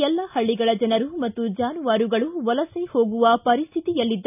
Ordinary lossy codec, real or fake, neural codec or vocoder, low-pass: none; real; none; 3.6 kHz